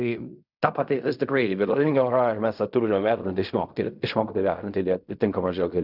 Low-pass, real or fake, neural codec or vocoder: 5.4 kHz; fake; codec, 16 kHz in and 24 kHz out, 0.4 kbps, LongCat-Audio-Codec, fine tuned four codebook decoder